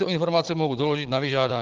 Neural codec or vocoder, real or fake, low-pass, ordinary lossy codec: codec, 16 kHz, 16 kbps, FunCodec, trained on Chinese and English, 50 frames a second; fake; 7.2 kHz; Opus, 16 kbps